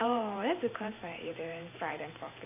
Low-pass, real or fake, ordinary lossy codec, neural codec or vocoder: 3.6 kHz; fake; AAC, 32 kbps; vocoder, 44.1 kHz, 128 mel bands every 512 samples, BigVGAN v2